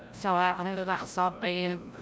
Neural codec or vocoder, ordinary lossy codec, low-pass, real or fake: codec, 16 kHz, 0.5 kbps, FreqCodec, larger model; none; none; fake